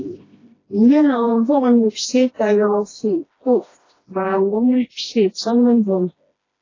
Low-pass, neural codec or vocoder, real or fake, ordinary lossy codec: 7.2 kHz; codec, 16 kHz, 1 kbps, FreqCodec, smaller model; fake; AAC, 32 kbps